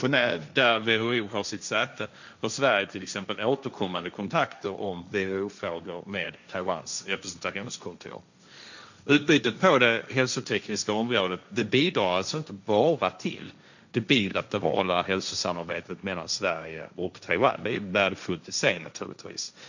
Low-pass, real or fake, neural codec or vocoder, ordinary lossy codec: 7.2 kHz; fake; codec, 16 kHz, 1.1 kbps, Voila-Tokenizer; none